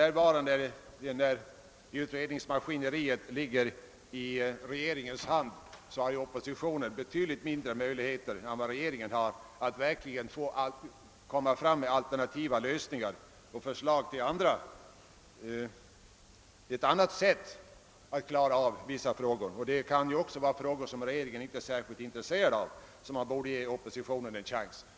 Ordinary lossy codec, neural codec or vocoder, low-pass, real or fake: none; none; none; real